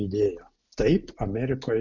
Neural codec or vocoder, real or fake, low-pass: none; real; 7.2 kHz